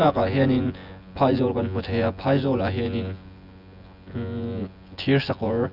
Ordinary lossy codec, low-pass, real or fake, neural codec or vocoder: none; 5.4 kHz; fake; vocoder, 24 kHz, 100 mel bands, Vocos